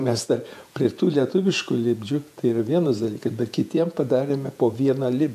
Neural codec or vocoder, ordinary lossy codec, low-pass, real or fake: vocoder, 48 kHz, 128 mel bands, Vocos; AAC, 96 kbps; 14.4 kHz; fake